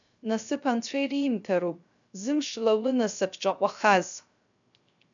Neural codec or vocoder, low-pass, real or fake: codec, 16 kHz, 0.3 kbps, FocalCodec; 7.2 kHz; fake